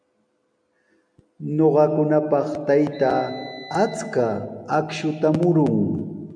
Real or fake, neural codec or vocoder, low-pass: real; none; 9.9 kHz